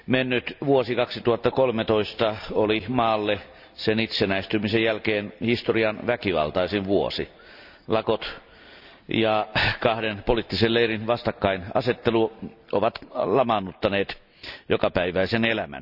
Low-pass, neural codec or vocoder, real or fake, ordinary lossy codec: 5.4 kHz; none; real; none